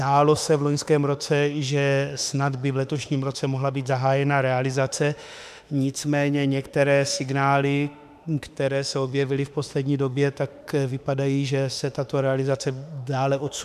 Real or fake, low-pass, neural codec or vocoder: fake; 14.4 kHz; autoencoder, 48 kHz, 32 numbers a frame, DAC-VAE, trained on Japanese speech